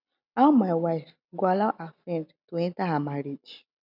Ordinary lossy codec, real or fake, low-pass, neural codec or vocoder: none; real; 5.4 kHz; none